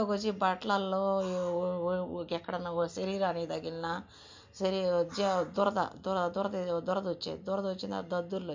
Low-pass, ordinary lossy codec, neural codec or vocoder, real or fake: 7.2 kHz; MP3, 48 kbps; none; real